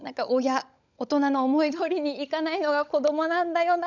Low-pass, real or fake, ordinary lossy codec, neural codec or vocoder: 7.2 kHz; fake; none; codec, 16 kHz, 16 kbps, FunCodec, trained on LibriTTS, 50 frames a second